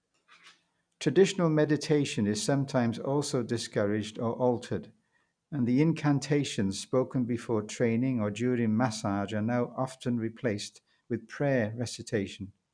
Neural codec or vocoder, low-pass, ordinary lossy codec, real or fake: none; 9.9 kHz; none; real